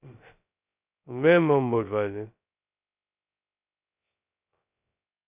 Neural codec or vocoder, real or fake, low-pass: codec, 16 kHz, 0.2 kbps, FocalCodec; fake; 3.6 kHz